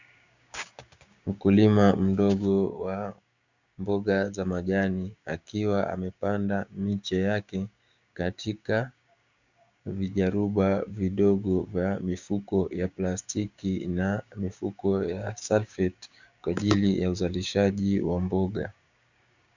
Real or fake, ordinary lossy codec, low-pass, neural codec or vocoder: fake; Opus, 64 kbps; 7.2 kHz; codec, 16 kHz, 6 kbps, DAC